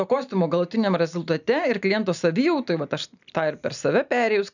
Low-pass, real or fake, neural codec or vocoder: 7.2 kHz; real; none